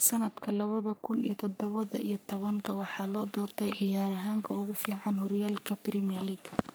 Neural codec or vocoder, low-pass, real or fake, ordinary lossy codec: codec, 44.1 kHz, 3.4 kbps, Pupu-Codec; none; fake; none